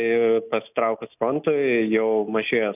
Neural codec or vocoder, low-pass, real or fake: none; 3.6 kHz; real